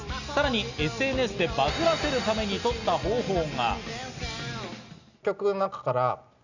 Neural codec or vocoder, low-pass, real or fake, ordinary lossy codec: none; 7.2 kHz; real; none